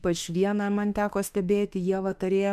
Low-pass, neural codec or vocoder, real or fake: 14.4 kHz; autoencoder, 48 kHz, 32 numbers a frame, DAC-VAE, trained on Japanese speech; fake